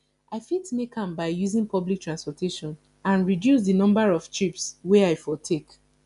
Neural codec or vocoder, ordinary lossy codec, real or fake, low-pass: none; none; real; 10.8 kHz